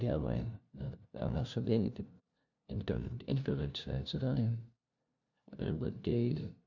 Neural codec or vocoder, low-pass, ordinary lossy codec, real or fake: codec, 16 kHz, 0.5 kbps, FunCodec, trained on LibriTTS, 25 frames a second; 7.2 kHz; none; fake